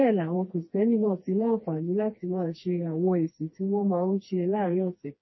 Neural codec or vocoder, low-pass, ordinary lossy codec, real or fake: codec, 16 kHz, 2 kbps, FreqCodec, smaller model; 7.2 kHz; MP3, 24 kbps; fake